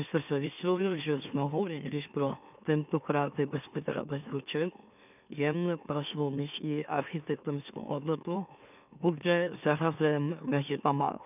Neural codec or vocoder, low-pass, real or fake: autoencoder, 44.1 kHz, a latent of 192 numbers a frame, MeloTTS; 3.6 kHz; fake